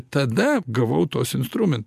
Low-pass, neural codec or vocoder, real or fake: 14.4 kHz; none; real